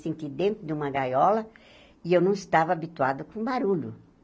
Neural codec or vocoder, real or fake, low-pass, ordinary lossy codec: none; real; none; none